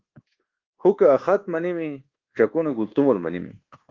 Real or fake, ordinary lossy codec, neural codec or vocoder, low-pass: fake; Opus, 16 kbps; codec, 24 kHz, 1.2 kbps, DualCodec; 7.2 kHz